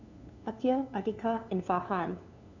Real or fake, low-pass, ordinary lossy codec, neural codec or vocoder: fake; 7.2 kHz; none; codec, 16 kHz, 2 kbps, FunCodec, trained on LibriTTS, 25 frames a second